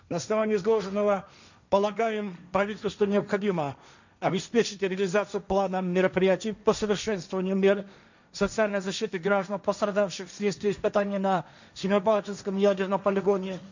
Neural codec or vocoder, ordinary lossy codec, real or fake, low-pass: codec, 16 kHz, 1.1 kbps, Voila-Tokenizer; none; fake; 7.2 kHz